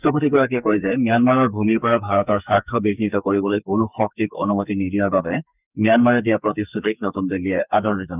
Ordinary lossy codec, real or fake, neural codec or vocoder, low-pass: none; fake; codec, 44.1 kHz, 2.6 kbps, SNAC; 3.6 kHz